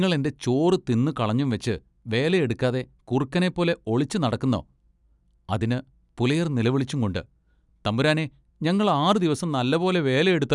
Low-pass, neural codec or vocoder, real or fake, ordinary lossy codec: 10.8 kHz; none; real; none